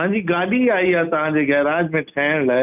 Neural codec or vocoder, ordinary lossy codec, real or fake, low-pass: none; none; real; 3.6 kHz